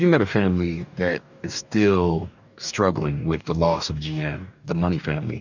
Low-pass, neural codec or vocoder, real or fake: 7.2 kHz; codec, 44.1 kHz, 2.6 kbps, DAC; fake